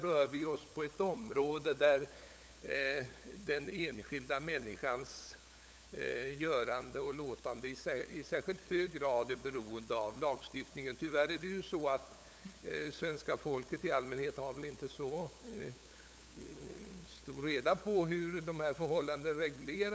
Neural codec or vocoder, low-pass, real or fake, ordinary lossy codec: codec, 16 kHz, 8 kbps, FunCodec, trained on LibriTTS, 25 frames a second; none; fake; none